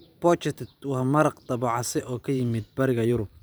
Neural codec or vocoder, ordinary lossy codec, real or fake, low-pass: vocoder, 44.1 kHz, 128 mel bands every 512 samples, BigVGAN v2; none; fake; none